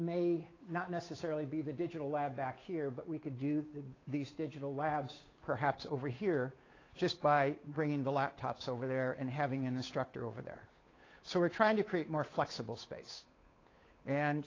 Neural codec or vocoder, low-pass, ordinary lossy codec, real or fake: codec, 16 kHz, 8 kbps, FunCodec, trained on Chinese and English, 25 frames a second; 7.2 kHz; AAC, 32 kbps; fake